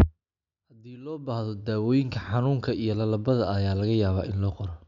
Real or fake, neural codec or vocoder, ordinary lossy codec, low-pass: real; none; none; 7.2 kHz